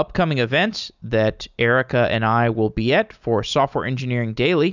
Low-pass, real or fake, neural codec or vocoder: 7.2 kHz; real; none